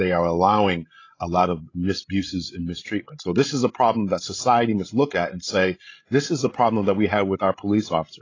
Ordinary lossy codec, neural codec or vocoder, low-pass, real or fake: AAC, 32 kbps; codec, 16 kHz, 16 kbps, FreqCodec, larger model; 7.2 kHz; fake